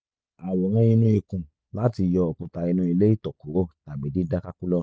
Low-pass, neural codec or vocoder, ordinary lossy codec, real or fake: none; none; none; real